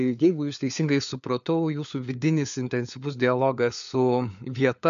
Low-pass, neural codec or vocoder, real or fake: 7.2 kHz; codec, 16 kHz, 4 kbps, FunCodec, trained on LibriTTS, 50 frames a second; fake